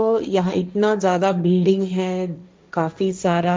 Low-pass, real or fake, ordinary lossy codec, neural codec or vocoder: none; fake; none; codec, 16 kHz, 1.1 kbps, Voila-Tokenizer